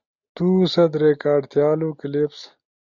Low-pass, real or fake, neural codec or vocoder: 7.2 kHz; real; none